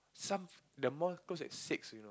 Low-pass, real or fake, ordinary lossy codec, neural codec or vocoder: none; real; none; none